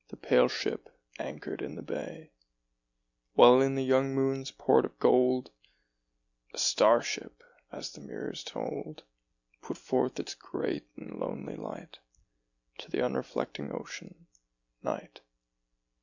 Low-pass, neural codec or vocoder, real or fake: 7.2 kHz; none; real